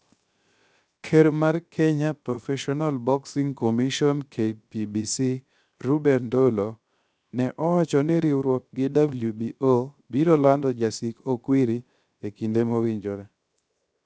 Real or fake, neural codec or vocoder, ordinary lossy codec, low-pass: fake; codec, 16 kHz, 0.7 kbps, FocalCodec; none; none